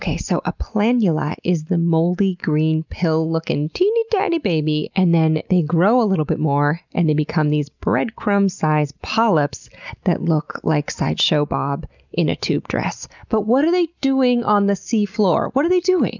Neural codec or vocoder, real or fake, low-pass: none; real; 7.2 kHz